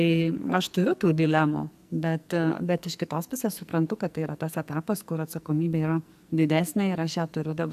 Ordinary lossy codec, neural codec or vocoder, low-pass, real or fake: AAC, 96 kbps; codec, 44.1 kHz, 2.6 kbps, SNAC; 14.4 kHz; fake